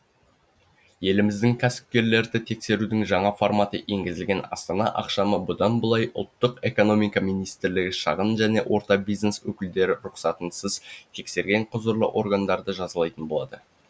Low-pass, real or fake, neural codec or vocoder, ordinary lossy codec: none; real; none; none